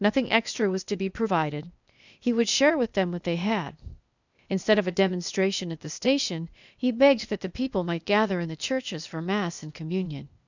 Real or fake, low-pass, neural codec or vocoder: fake; 7.2 kHz; codec, 16 kHz, 0.8 kbps, ZipCodec